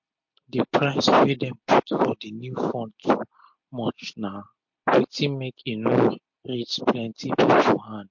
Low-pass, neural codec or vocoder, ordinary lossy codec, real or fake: 7.2 kHz; none; MP3, 48 kbps; real